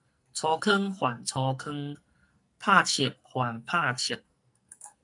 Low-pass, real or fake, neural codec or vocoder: 10.8 kHz; fake; codec, 44.1 kHz, 2.6 kbps, SNAC